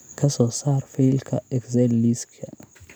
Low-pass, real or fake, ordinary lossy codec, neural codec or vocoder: none; real; none; none